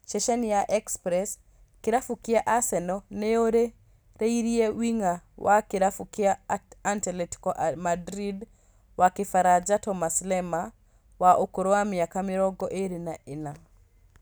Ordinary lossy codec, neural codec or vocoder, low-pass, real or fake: none; none; none; real